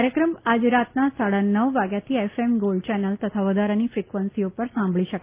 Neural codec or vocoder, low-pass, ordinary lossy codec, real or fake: none; 3.6 kHz; Opus, 24 kbps; real